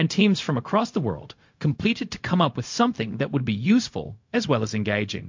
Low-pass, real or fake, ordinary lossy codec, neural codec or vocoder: 7.2 kHz; fake; MP3, 48 kbps; codec, 16 kHz, 0.4 kbps, LongCat-Audio-Codec